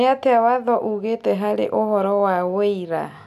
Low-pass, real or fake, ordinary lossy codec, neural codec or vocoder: 14.4 kHz; real; none; none